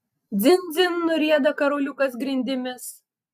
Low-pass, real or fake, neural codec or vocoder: 14.4 kHz; real; none